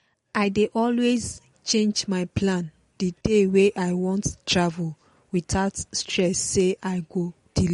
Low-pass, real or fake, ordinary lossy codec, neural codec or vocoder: 10.8 kHz; real; MP3, 48 kbps; none